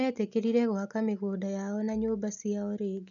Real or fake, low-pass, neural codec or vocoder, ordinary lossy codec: real; 7.2 kHz; none; none